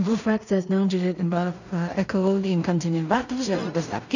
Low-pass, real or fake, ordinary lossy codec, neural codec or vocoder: 7.2 kHz; fake; none; codec, 16 kHz in and 24 kHz out, 0.4 kbps, LongCat-Audio-Codec, two codebook decoder